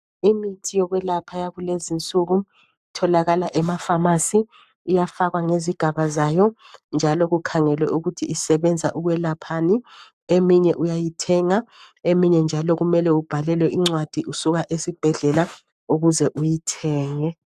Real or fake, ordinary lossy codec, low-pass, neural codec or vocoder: fake; AAC, 96 kbps; 14.4 kHz; codec, 44.1 kHz, 7.8 kbps, Pupu-Codec